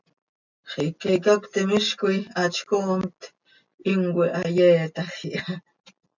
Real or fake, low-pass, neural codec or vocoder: real; 7.2 kHz; none